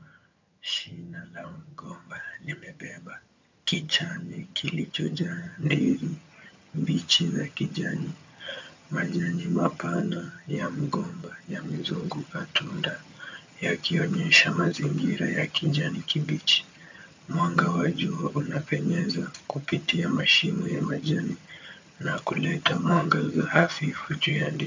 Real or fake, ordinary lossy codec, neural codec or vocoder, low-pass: fake; MP3, 64 kbps; vocoder, 22.05 kHz, 80 mel bands, HiFi-GAN; 7.2 kHz